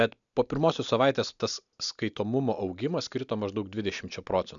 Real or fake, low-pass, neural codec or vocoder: real; 7.2 kHz; none